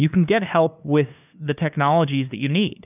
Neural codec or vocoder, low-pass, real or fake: codec, 16 kHz, 2 kbps, FunCodec, trained on LibriTTS, 25 frames a second; 3.6 kHz; fake